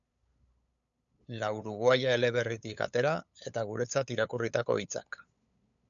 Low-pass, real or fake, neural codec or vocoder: 7.2 kHz; fake; codec, 16 kHz, 8 kbps, FunCodec, trained on LibriTTS, 25 frames a second